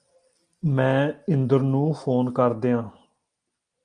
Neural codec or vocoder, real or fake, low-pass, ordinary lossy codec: none; real; 9.9 kHz; Opus, 32 kbps